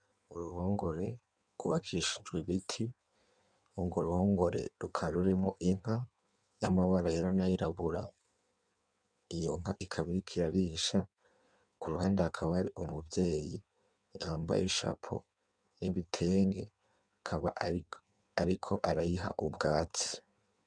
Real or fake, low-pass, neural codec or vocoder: fake; 9.9 kHz; codec, 16 kHz in and 24 kHz out, 1.1 kbps, FireRedTTS-2 codec